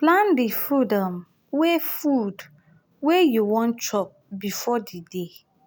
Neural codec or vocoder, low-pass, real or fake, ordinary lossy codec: none; none; real; none